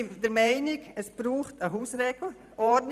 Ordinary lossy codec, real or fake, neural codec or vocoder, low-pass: none; fake; vocoder, 44.1 kHz, 128 mel bands every 512 samples, BigVGAN v2; 14.4 kHz